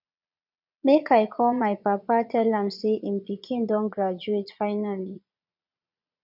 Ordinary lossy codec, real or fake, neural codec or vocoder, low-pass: none; fake; vocoder, 22.05 kHz, 80 mel bands, Vocos; 5.4 kHz